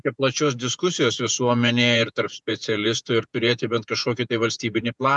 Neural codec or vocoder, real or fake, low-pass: vocoder, 48 kHz, 128 mel bands, Vocos; fake; 10.8 kHz